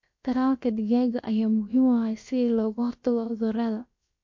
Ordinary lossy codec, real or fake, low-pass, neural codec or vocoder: MP3, 48 kbps; fake; 7.2 kHz; codec, 16 kHz, about 1 kbps, DyCAST, with the encoder's durations